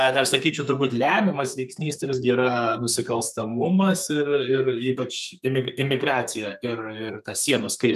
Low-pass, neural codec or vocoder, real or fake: 14.4 kHz; codec, 32 kHz, 1.9 kbps, SNAC; fake